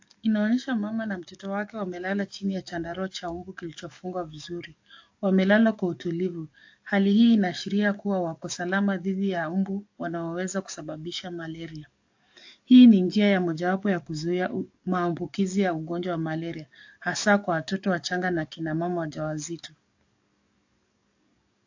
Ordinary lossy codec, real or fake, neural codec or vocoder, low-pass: AAC, 48 kbps; fake; codec, 44.1 kHz, 7.8 kbps, DAC; 7.2 kHz